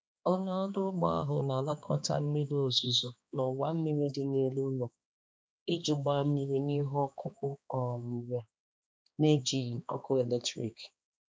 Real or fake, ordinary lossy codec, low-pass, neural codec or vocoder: fake; none; none; codec, 16 kHz, 2 kbps, X-Codec, HuBERT features, trained on balanced general audio